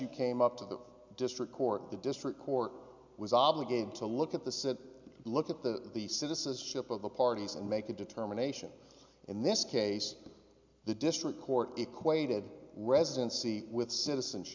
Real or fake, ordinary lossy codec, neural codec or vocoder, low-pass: real; MP3, 64 kbps; none; 7.2 kHz